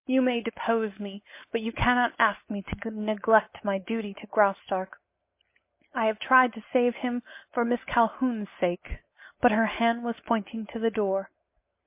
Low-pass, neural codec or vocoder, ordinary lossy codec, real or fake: 3.6 kHz; none; MP3, 24 kbps; real